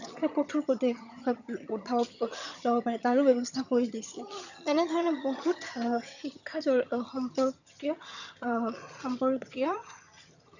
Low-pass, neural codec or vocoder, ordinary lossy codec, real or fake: 7.2 kHz; vocoder, 22.05 kHz, 80 mel bands, HiFi-GAN; none; fake